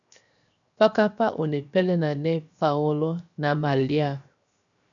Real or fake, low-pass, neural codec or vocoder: fake; 7.2 kHz; codec, 16 kHz, 0.7 kbps, FocalCodec